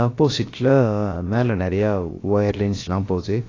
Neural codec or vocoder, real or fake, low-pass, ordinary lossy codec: codec, 16 kHz, about 1 kbps, DyCAST, with the encoder's durations; fake; 7.2 kHz; AAC, 32 kbps